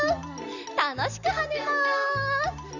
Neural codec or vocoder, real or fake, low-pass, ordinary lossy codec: none; real; 7.2 kHz; none